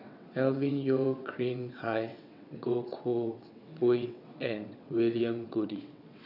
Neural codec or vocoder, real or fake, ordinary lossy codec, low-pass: vocoder, 22.05 kHz, 80 mel bands, WaveNeXt; fake; none; 5.4 kHz